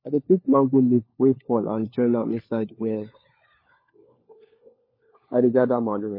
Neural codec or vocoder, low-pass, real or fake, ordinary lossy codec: codec, 16 kHz, 4 kbps, FunCodec, trained on LibriTTS, 50 frames a second; 5.4 kHz; fake; MP3, 24 kbps